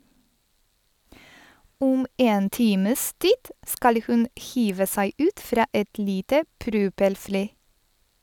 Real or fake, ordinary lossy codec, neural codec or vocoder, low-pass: real; none; none; 19.8 kHz